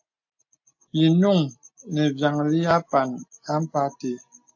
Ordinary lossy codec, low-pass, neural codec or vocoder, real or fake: AAC, 48 kbps; 7.2 kHz; none; real